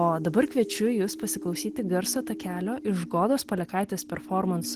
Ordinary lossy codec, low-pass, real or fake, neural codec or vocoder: Opus, 16 kbps; 14.4 kHz; real; none